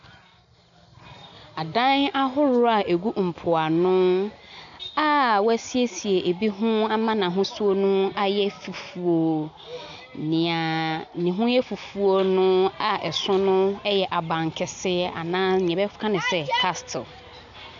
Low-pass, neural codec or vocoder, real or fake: 7.2 kHz; none; real